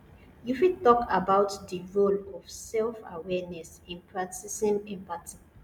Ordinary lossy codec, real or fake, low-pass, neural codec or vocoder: none; real; 19.8 kHz; none